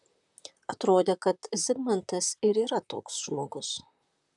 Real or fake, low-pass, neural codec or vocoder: fake; 10.8 kHz; vocoder, 44.1 kHz, 128 mel bands, Pupu-Vocoder